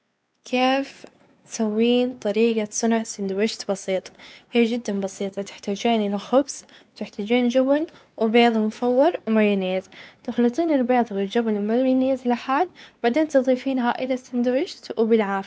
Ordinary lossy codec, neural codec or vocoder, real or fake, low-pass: none; codec, 16 kHz, 4 kbps, X-Codec, WavLM features, trained on Multilingual LibriSpeech; fake; none